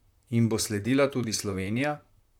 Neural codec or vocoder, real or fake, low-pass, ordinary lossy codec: vocoder, 44.1 kHz, 128 mel bands, Pupu-Vocoder; fake; 19.8 kHz; MP3, 96 kbps